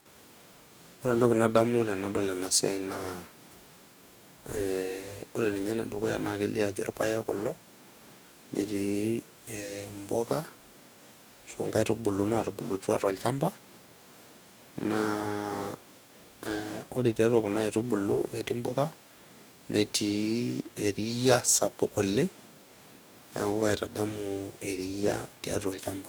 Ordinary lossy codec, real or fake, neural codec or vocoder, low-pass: none; fake; codec, 44.1 kHz, 2.6 kbps, DAC; none